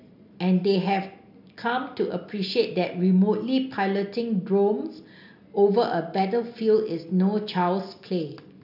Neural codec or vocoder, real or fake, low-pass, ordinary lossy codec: none; real; 5.4 kHz; none